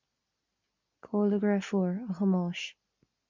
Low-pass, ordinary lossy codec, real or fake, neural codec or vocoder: 7.2 kHz; MP3, 64 kbps; real; none